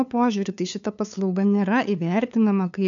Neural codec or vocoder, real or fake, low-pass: codec, 16 kHz, 2 kbps, FunCodec, trained on LibriTTS, 25 frames a second; fake; 7.2 kHz